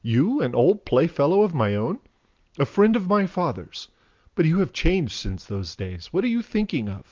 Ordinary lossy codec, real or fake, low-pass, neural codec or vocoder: Opus, 24 kbps; real; 7.2 kHz; none